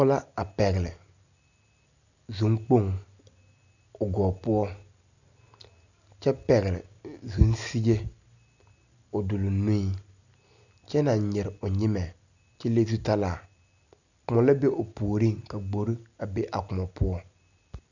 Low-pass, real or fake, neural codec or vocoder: 7.2 kHz; real; none